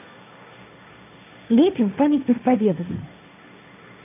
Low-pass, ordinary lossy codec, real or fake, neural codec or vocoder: 3.6 kHz; none; fake; codec, 16 kHz, 1.1 kbps, Voila-Tokenizer